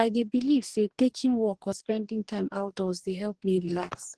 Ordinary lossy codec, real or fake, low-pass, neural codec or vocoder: Opus, 16 kbps; fake; 10.8 kHz; codec, 44.1 kHz, 2.6 kbps, DAC